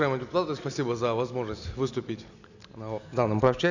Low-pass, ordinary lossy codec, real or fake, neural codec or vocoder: 7.2 kHz; none; real; none